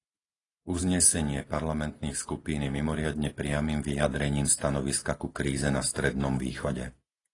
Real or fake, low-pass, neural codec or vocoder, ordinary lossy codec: real; 10.8 kHz; none; AAC, 32 kbps